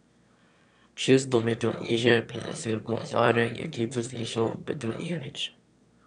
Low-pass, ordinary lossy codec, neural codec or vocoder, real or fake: 9.9 kHz; none; autoencoder, 22.05 kHz, a latent of 192 numbers a frame, VITS, trained on one speaker; fake